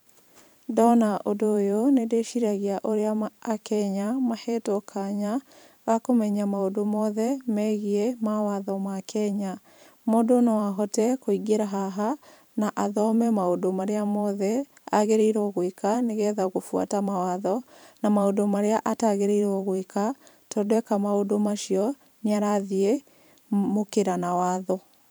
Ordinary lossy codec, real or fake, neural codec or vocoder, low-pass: none; fake; vocoder, 44.1 kHz, 128 mel bands every 512 samples, BigVGAN v2; none